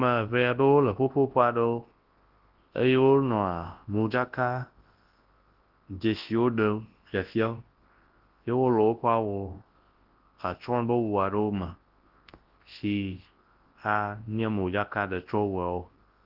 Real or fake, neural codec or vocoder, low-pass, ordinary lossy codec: fake; codec, 24 kHz, 0.9 kbps, WavTokenizer, large speech release; 5.4 kHz; Opus, 16 kbps